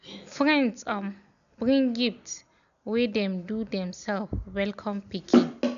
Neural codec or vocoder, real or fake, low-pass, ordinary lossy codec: none; real; 7.2 kHz; none